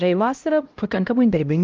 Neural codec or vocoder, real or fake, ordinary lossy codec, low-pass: codec, 16 kHz, 0.5 kbps, X-Codec, HuBERT features, trained on LibriSpeech; fake; Opus, 32 kbps; 7.2 kHz